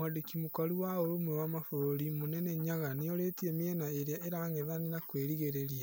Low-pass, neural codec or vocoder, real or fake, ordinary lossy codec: none; none; real; none